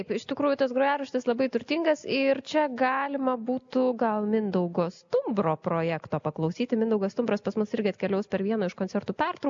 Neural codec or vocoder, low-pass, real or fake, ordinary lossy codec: none; 7.2 kHz; real; AAC, 64 kbps